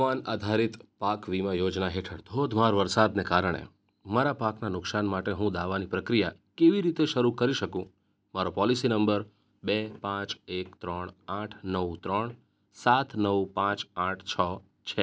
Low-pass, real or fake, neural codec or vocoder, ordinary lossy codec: none; real; none; none